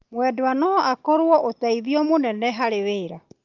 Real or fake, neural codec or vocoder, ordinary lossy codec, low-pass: real; none; Opus, 32 kbps; 7.2 kHz